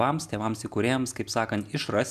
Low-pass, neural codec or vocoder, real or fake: 14.4 kHz; none; real